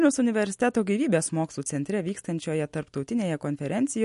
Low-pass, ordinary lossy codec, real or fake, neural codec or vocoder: 14.4 kHz; MP3, 48 kbps; real; none